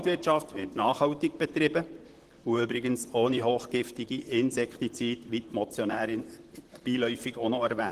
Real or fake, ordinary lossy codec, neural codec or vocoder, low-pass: fake; Opus, 16 kbps; vocoder, 44.1 kHz, 128 mel bands, Pupu-Vocoder; 14.4 kHz